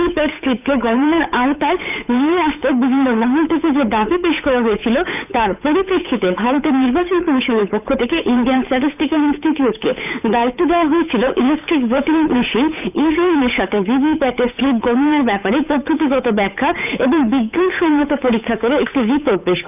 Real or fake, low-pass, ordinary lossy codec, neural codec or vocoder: fake; 3.6 kHz; none; codec, 16 kHz, 8 kbps, FunCodec, trained on Chinese and English, 25 frames a second